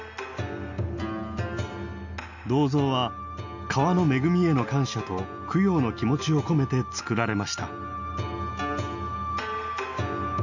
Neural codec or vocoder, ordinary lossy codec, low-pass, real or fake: none; none; 7.2 kHz; real